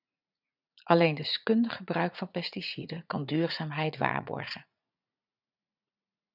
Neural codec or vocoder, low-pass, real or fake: vocoder, 44.1 kHz, 80 mel bands, Vocos; 5.4 kHz; fake